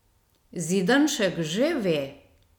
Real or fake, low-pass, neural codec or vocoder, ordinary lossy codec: real; 19.8 kHz; none; none